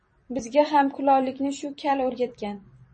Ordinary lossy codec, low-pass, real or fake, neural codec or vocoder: MP3, 32 kbps; 10.8 kHz; fake; vocoder, 44.1 kHz, 128 mel bands every 256 samples, BigVGAN v2